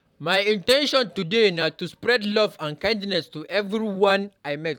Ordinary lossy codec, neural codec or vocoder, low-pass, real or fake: none; vocoder, 44.1 kHz, 128 mel bands, Pupu-Vocoder; 19.8 kHz; fake